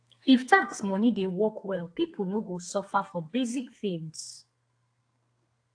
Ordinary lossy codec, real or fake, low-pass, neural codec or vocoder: AAC, 48 kbps; fake; 9.9 kHz; codec, 32 kHz, 1.9 kbps, SNAC